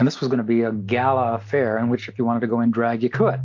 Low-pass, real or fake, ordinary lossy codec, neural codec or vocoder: 7.2 kHz; real; AAC, 48 kbps; none